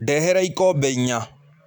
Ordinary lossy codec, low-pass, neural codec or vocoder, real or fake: none; 19.8 kHz; vocoder, 44.1 kHz, 128 mel bands every 256 samples, BigVGAN v2; fake